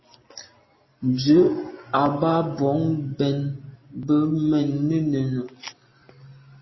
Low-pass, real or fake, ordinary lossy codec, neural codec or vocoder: 7.2 kHz; real; MP3, 24 kbps; none